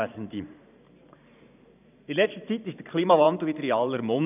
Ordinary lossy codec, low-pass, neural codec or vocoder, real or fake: none; 3.6 kHz; vocoder, 44.1 kHz, 128 mel bands every 512 samples, BigVGAN v2; fake